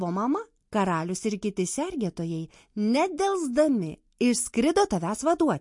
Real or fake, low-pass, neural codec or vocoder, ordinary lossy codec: real; 10.8 kHz; none; MP3, 48 kbps